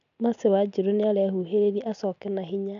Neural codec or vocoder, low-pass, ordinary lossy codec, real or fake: none; 7.2 kHz; none; real